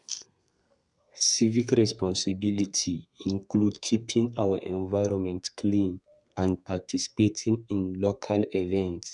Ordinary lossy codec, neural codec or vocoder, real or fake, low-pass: none; codec, 32 kHz, 1.9 kbps, SNAC; fake; 10.8 kHz